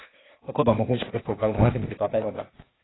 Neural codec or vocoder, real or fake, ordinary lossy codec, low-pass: codec, 16 kHz, 0.8 kbps, ZipCodec; fake; AAC, 16 kbps; 7.2 kHz